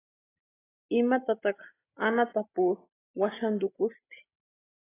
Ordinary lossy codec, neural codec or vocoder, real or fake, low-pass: AAC, 16 kbps; none; real; 3.6 kHz